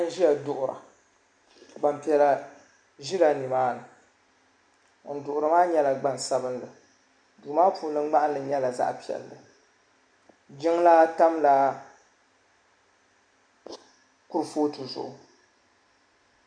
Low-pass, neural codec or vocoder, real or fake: 9.9 kHz; none; real